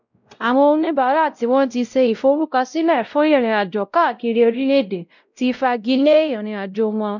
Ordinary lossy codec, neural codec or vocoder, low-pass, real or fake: none; codec, 16 kHz, 0.5 kbps, X-Codec, WavLM features, trained on Multilingual LibriSpeech; 7.2 kHz; fake